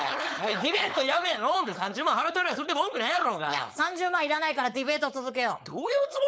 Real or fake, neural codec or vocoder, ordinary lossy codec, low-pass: fake; codec, 16 kHz, 4.8 kbps, FACodec; none; none